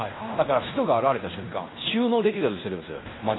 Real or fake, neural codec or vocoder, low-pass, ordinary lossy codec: fake; codec, 16 kHz in and 24 kHz out, 0.9 kbps, LongCat-Audio-Codec, fine tuned four codebook decoder; 7.2 kHz; AAC, 16 kbps